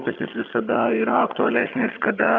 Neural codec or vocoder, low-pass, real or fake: vocoder, 22.05 kHz, 80 mel bands, HiFi-GAN; 7.2 kHz; fake